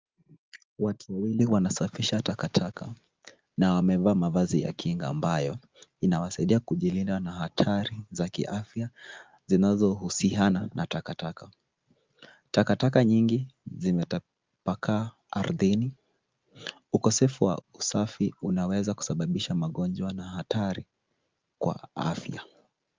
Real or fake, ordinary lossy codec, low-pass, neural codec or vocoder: real; Opus, 24 kbps; 7.2 kHz; none